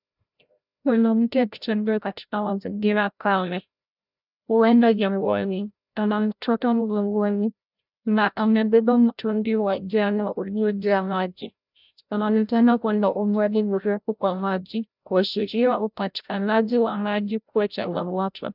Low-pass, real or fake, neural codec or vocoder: 5.4 kHz; fake; codec, 16 kHz, 0.5 kbps, FreqCodec, larger model